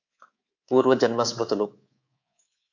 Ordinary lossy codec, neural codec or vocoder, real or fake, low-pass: AAC, 48 kbps; codec, 24 kHz, 3.1 kbps, DualCodec; fake; 7.2 kHz